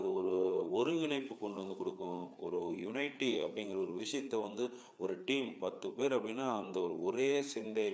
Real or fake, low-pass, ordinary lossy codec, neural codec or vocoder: fake; none; none; codec, 16 kHz, 4 kbps, FreqCodec, larger model